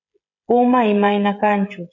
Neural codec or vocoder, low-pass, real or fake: codec, 16 kHz, 16 kbps, FreqCodec, smaller model; 7.2 kHz; fake